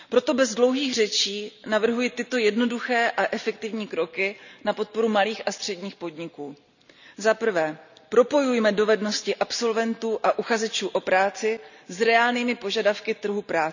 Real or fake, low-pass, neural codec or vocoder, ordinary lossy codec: real; 7.2 kHz; none; none